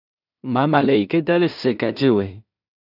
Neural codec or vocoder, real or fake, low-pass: codec, 16 kHz in and 24 kHz out, 0.4 kbps, LongCat-Audio-Codec, two codebook decoder; fake; 5.4 kHz